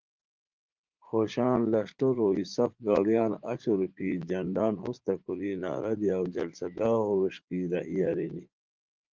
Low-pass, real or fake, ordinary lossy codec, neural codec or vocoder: 7.2 kHz; fake; Opus, 32 kbps; vocoder, 44.1 kHz, 80 mel bands, Vocos